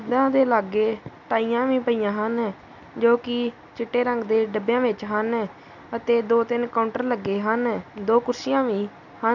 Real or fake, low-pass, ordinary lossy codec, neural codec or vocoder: real; 7.2 kHz; none; none